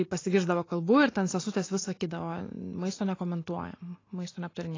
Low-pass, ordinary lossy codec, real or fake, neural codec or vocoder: 7.2 kHz; AAC, 32 kbps; real; none